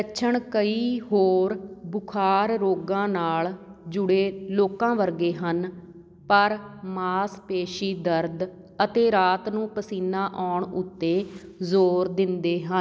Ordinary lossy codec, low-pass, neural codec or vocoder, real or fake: none; none; none; real